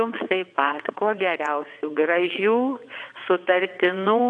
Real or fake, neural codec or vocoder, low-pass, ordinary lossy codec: fake; vocoder, 22.05 kHz, 80 mel bands, WaveNeXt; 9.9 kHz; AAC, 64 kbps